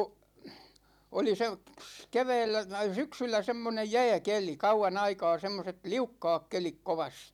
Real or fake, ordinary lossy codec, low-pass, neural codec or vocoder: real; none; 19.8 kHz; none